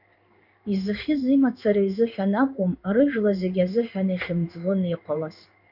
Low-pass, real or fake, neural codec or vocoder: 5.4 kHz; fake; codec, 16 kHz in and 24 kHz out, 1 kbps, XY-Tokenizer